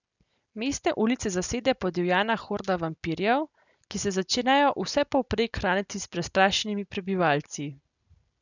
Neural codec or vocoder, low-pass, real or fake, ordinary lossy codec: none; 7.2 kHz; real; none